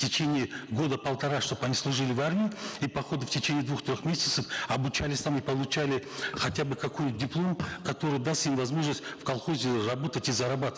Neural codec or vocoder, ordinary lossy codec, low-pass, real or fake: none; none; none; real